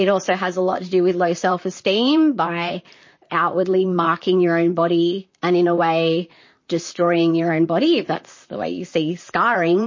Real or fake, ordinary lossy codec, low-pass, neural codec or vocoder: fake; MP3, 32 kbps; 7.2 kHz; vocoder, 44.1 kHz, 128 mel bands, Pupu-Vocoder